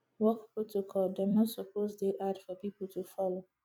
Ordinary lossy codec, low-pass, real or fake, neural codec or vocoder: none; 19.8 kHz; fake; vocoder, 44.1 kHz, 128 mel bands every 256 samples, BigVGAN v2